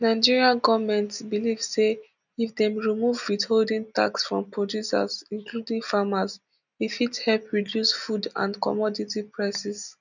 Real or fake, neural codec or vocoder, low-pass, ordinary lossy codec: real; none; 7.2 kHz; none